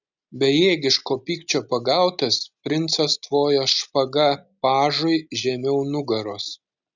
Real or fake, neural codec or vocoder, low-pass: real; none; 7.2 kHz